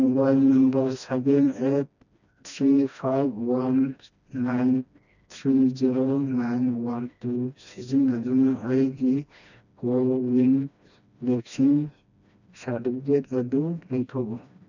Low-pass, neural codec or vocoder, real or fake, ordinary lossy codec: 7.2 kHz; codec, 16 kHz, 1 kbps, FreqCodec, smaller model; fake; none